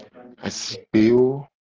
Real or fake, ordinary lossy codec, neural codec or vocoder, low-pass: real; Opus, 16 kbps; none; 7.2 kHz